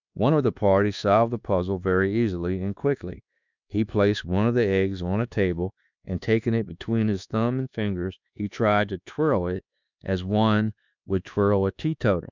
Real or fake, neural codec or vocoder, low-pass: fake; codec, 24 kHz, 1.2 kbps, DualCodec; 7.2 kHz